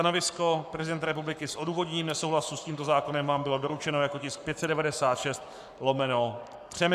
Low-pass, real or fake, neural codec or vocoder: 14.4 kHz; fake; codec, 44.1 kHz, 7.8 kbps, Pupu-Codec